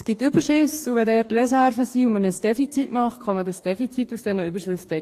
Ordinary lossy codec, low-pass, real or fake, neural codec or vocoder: AAC, 64 kbps; 14.4 kHz; fake; codec, 44.1 kHz, 2.6 kbps, DAC